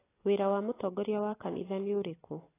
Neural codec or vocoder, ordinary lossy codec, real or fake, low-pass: none; AAC, 16 kbps; real; 3.6 kHz